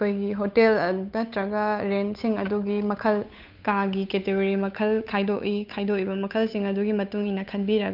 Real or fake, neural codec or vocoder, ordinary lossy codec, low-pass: fake; codec, 16 kHz, 8 kbps, FunCodec, trained on Chinese and English, 25 frames a second; none; 5.4 kHz